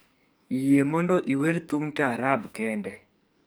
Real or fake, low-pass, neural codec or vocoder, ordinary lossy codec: fake; none; codec, 44.1 kHz, 2.6 kbps, SNAC; none